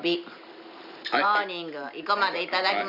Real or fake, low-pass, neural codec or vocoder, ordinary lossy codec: real; 5.4 kHz; none; none